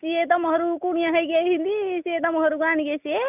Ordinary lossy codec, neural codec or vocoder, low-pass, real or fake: none; none; 3.6 kHz; real